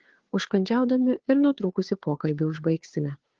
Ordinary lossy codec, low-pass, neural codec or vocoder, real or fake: Opus, 16 kbps; 7.2 kHz; codec, 16 kHz, 2 kbps, FunCodec, trained on Chinese and English, 25 frames a second; fake